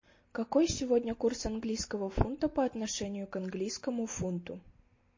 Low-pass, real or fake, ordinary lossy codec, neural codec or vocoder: 7.2 kHz; real; MP3, 32 kbps; none